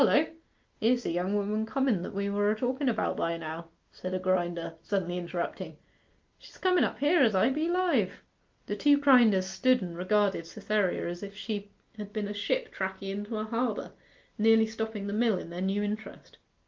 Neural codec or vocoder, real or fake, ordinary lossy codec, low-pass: none; real; Opus, 32 kbps; 7.2 kHz